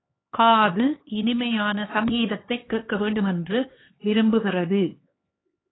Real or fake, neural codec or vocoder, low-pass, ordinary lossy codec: fake; codec, 16 kHz, 4 kbps, X-Codec, HuBERT features, trained on LibriSpeech; 7.2 kHz; AAC, 16 kbps